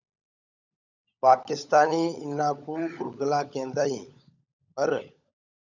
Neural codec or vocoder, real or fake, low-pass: codec, 16 kHz, 16 kbps, FunCodec, trained on LibriTTS, 50 frames a second; fake; 7.2 kHz